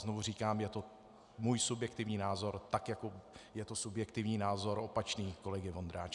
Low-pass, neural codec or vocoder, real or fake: 10.8 kHz; none; real